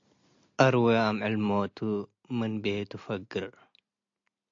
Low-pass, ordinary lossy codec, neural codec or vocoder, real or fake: 7.2 kHz; AAC, 48 kbps; none; real